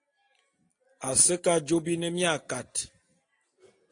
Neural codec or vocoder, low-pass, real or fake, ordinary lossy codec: none; 9.9 kHz; real; AAC, 48 kbps